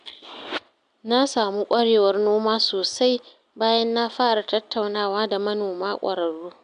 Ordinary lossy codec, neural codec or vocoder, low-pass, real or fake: none; none; 9.9 kHz; real